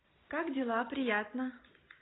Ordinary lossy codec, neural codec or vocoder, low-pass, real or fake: AAC, 16 kbps; none; 7.2 kHz; real